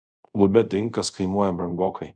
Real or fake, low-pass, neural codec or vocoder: fake; 9.9 kHz; codec, 24 kHz, 0.5 kbps, DualCodec